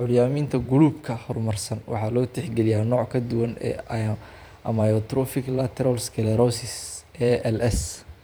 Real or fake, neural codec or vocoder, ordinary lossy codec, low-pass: fake; vocoder, 44.1 kHz, 128 mel bands every 512 samples, BigVGAN v2; none; none